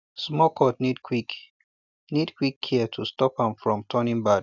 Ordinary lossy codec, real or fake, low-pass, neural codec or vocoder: none; real; 7.2 kHz; none